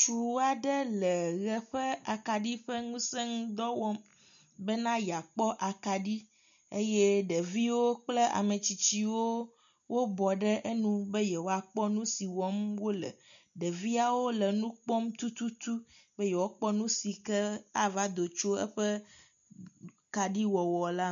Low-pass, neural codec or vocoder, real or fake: 7.2 kHz; none; real